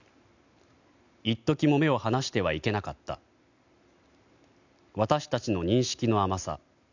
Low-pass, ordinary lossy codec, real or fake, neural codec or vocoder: 7.2 kHz; none; real; none